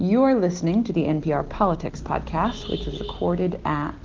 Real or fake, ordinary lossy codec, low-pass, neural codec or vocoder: real; Opus, 16 kbps; 7.2 kHz; none